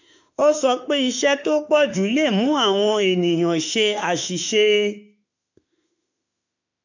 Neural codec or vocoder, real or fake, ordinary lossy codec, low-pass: autoencoder, 48 kHz, 32 numbers a frame, DAC-VAE, trained on Japanese speech; fake; none; 7.2 kHz